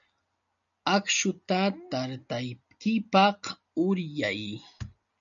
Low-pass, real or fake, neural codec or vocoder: 7.2 kHz; real; none